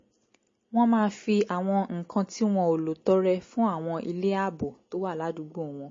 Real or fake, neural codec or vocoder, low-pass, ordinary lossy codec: real; none; 7.2 kHz; MP3, 32 kbps